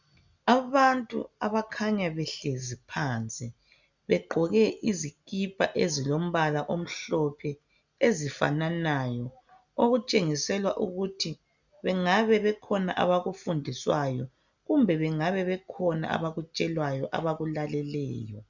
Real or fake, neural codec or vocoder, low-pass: real; none; 7.2 kHz